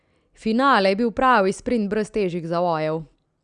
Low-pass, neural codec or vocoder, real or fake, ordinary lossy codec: 9.9 kHz; none; real; Opus, 64 kbps